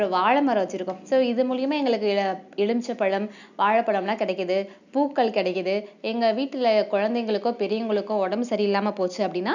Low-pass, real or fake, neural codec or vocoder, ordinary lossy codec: 7.2 kHz; real; none; none